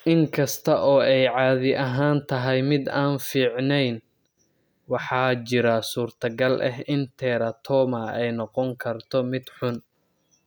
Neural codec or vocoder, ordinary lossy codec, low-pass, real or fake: none; none; none; real